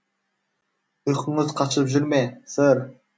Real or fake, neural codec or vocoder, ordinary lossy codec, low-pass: real; none; none; none